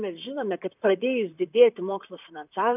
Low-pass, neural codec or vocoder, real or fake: 3.6 kHz; vocoder, 44.1 kHz, 128 mel bands, Pupu-Vocoder; fake